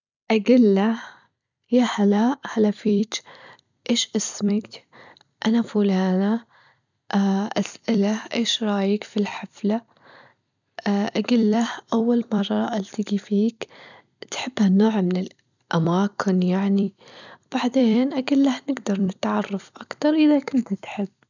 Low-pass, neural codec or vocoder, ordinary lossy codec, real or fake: 7.2 kHz; vocoder, 44.1 kHz, 128 mel bands every 256 samples, BigVGAN v2; none; fake